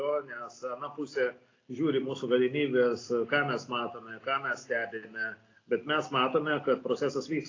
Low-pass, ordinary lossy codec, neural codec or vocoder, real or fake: 7.2 kHz; AAC, 32 kbps; none; real